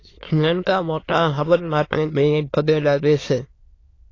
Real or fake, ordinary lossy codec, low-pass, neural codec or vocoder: fake; AAC, 32 kbps; 7.2 kHz; autoencoder, 22.05 kHz, a latent of 192 numbers a frame, VITS, trained on many speakers